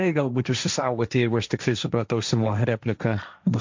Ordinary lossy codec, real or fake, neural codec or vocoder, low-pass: MP3, 64 kbps; fake; codec, 16 kHz, 1.1 kbps, Voila-Tokenizer; 7.2 kHz